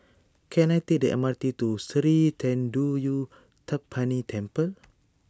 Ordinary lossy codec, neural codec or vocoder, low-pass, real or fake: none; none; none; real